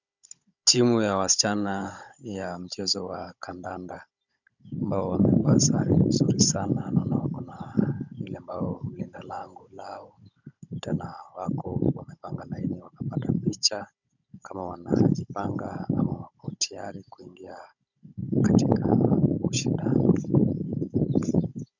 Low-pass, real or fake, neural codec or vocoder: 7.2 kHz; fake; codec, 16 kHz, 16 kbps, FunCodec, trained on Chinese and English, 50 frames a second